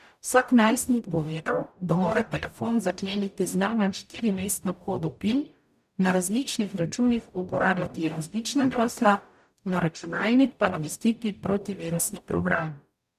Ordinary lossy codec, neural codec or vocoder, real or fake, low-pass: none; codec, 44.1 kHz, 0.9 kbps, DAC; fake; 14.4 kHz